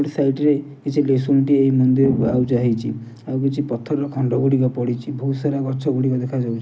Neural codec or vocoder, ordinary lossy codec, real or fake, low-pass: none; none; real; none